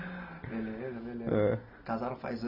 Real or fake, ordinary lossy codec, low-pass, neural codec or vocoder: real; none; 5.4 kHz; none